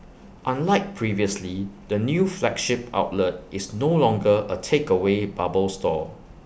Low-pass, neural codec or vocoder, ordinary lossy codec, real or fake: none; none; none; real